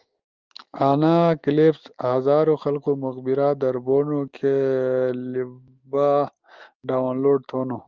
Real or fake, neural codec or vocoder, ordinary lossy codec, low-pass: fake; autoencoder, 48 kHz, 128 numbers a frame, DAC-VAE, trained on Japanese speech; Opus, 32 kbps; 7.2 kHz